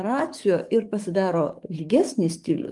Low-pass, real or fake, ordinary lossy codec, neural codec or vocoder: 10.8 kHz; fake; Opus, 32 kbps; codec, 44.1 kHz, 7.8 kbps, DAC